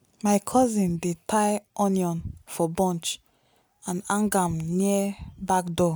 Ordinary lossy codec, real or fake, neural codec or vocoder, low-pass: none; real; none; none